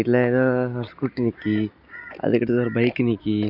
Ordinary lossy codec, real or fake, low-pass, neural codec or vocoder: none; real; 5.4 kHz; none